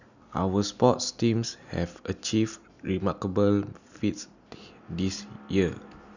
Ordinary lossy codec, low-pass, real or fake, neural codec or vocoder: none; 7.2 kHz; real; none